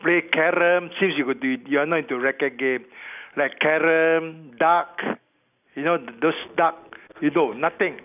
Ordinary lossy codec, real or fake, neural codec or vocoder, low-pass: none; real; none; 3.6 kHz